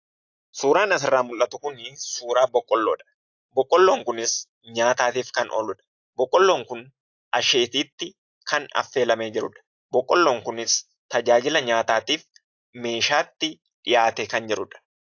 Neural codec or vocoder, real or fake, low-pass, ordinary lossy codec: none; real; 7.2 kHz; AAC, 48 kbps